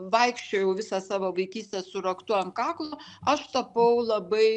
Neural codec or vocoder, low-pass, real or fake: none; 10.8 kHz; real